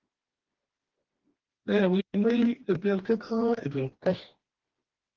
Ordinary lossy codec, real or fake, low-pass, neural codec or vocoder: Opus, 16 kbps; fake; 7.2 kHz; codec, 16 kHz, 2 kbps, FreqCodec, smaller model